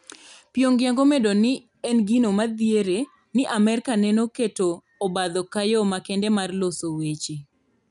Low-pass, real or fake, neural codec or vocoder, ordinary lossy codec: 10.8 kHz; real; none; none